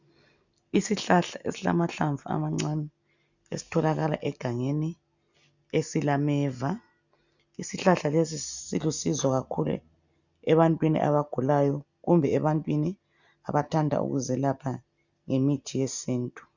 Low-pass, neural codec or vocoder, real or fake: 7.2 kHz; none; real